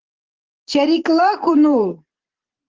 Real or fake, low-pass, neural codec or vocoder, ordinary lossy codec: fake; 7.2 kHz; vocoder, 44.1 kHz, 128 mel bands every 512 samples, BigVGAN v2; Opus, 16 kbps